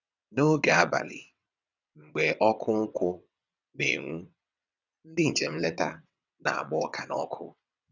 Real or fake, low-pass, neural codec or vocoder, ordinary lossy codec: fake; 7.2 kHz; vocoder, 22.05 kHz, 80 mel bands, WaveNeXt; none